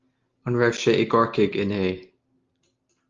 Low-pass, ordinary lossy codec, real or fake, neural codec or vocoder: 7.2 kHz; Opus, 32 kbps; real; none